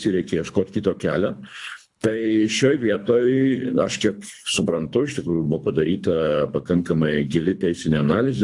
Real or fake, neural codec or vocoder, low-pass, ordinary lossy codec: fake; codec, 24 kHz, 3 kbps, HILCodec; 10.8 kHz; AAC, 64 kbps